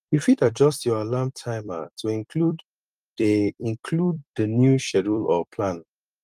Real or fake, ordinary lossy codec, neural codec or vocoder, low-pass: fake; Opus, 32 kbps; vocoder, 44.1 kHz, 128 mel bands every 256 samples, BigVGAN v2; 14.4 kHz